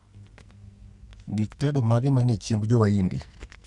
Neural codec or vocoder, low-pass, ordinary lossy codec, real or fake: codec, 44.1 kHz, 2.6 kbps, SNAC; 10.8 kHz; none; fake